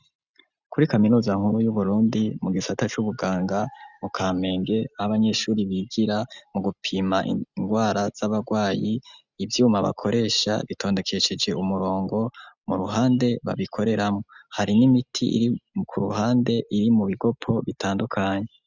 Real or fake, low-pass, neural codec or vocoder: real; 7.2 kHz; none